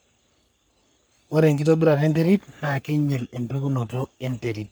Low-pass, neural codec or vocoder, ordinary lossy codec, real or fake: none; codec, 44.1 kHz, 3.4 kbps, Pupu-Codec; none; fake